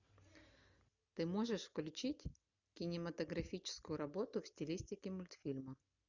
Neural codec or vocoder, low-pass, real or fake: none; 7.2 kHz; real